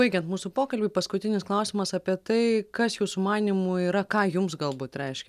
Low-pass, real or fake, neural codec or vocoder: 14.4 kHz; real; none